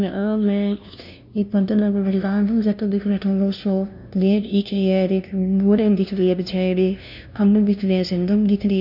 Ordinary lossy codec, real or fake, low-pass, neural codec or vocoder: none; fake; 5.4 kHz; codec, 16 kHz, 0.5 kbps, FunCodec, trained on LibriTTS, 25 frames a second